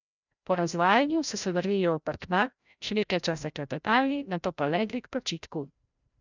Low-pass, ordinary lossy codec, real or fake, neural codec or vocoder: 7.2 kHz; none; fake; codec, 16 kHz, 0.5 kbps, FreqCodec, larger model